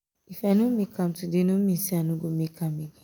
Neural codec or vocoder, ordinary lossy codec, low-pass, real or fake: none; none; none; real